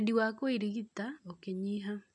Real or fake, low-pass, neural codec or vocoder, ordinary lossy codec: real; 9.9 kHz; none; none